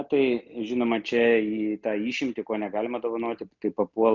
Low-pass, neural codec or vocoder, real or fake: 7.2 kHz; none; real